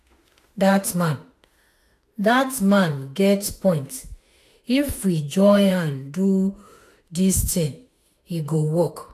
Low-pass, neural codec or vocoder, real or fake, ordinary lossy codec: 14.4 kHz; autoencoder, 48 kHz, 32 numbers a frame, DAC-VAE, trained on Japanese speech; fake; AAC, 64 kbps